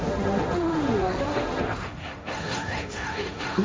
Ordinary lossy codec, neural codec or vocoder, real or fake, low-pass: none; codec, 16 kHz, 1.1 kbps, Voila-Tokenizer; fake; none